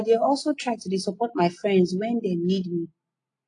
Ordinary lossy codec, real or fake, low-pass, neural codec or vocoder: AAC, 48 kbps; real; 9.9 kHz; none